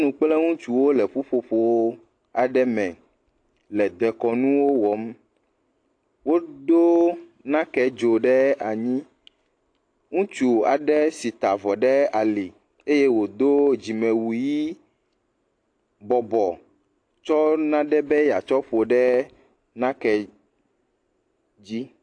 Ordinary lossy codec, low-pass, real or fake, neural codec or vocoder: AAC, 48 kbps; 9.9 kHz; real; none